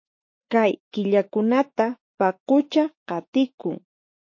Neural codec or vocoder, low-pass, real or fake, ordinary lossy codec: autoencoder, 48 kHz, 128 numbers a frame, DAC-VAE, trained on Japanese speech; 7.2 kHz; fake; MP3, 32 kbps